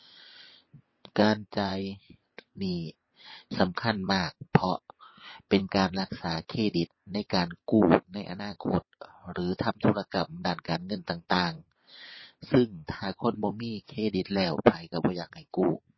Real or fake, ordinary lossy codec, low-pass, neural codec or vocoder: fake; MP3, 24 kbps; 7.2 kHz; vocoder, 22.05 kHz, 80 mel bands, WaveNeXt